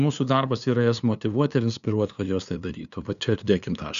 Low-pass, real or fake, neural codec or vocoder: 7.2 kHz; fake; codec, 16 kHz, 2 kbps, FunCodec, trained on LibriTTS, 25 frames a second